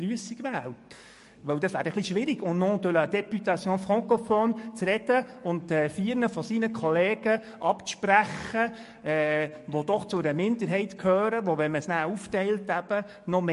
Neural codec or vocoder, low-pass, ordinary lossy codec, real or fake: codec, 44.1 kHz, 7.8 kbps, DAC; 14.4 kHz; MP3, 48 kbps; fake